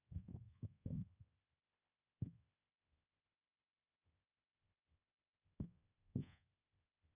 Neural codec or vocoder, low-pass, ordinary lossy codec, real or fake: codec, 24 kHz, 1.2 kbps, DualCodec; 3.6 kHz; none; fake